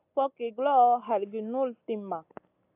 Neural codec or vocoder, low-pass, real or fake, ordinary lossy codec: none; 3.6 kHz; real; AAC, 32 kbps